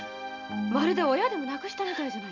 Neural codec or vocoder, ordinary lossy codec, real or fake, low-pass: none; none; real; 7.2 kHz